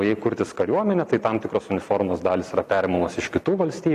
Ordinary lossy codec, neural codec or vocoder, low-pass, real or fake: MP3, 96 kbps; none; 14.4 kHz; real